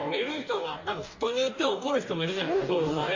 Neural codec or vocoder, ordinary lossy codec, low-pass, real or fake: codec, 44.1 kHz, 2.6 kbps, DAC; MP3, 64 kbps; 7.2 kHz; fake